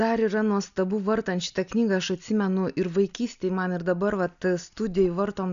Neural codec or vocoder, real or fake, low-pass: none; real; 7.2 kHz